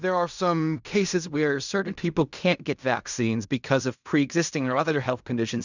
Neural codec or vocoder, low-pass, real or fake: codec, 16 kHz in and 24 kHz out, 0.4 kbps, LongCat-Audio-Codec, fine tuned four codebook decoder; 7.2 kHz; fake